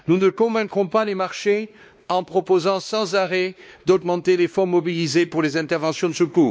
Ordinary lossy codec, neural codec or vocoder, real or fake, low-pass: none; codec, 16 kHz, 2 kbps, X-Codec, WavLM features, trained on Multilingual LibriSpeech; fake; none